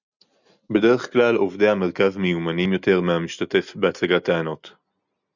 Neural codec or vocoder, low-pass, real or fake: none; 7.2 kHz; real